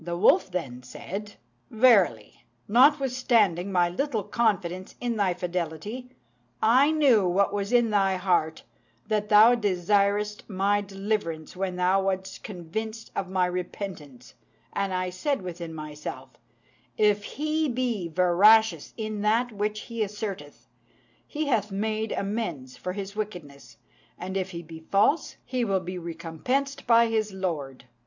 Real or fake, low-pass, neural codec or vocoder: real; 7.2 kHz; none